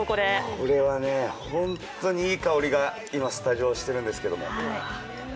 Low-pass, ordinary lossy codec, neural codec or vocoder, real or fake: none; none; none; real